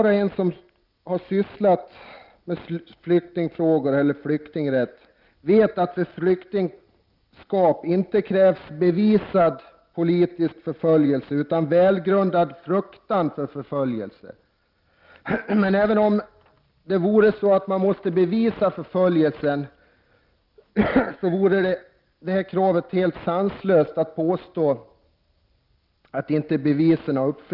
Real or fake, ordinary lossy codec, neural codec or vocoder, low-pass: real; Opus, 32 kbps; none; 5.4 kHz